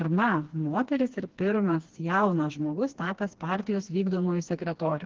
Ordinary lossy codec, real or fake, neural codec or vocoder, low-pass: Opus, 16 kbps; fake; codec, 16 kHz, 2 kbps, FreqCodec, smaller model; 7.2 kHz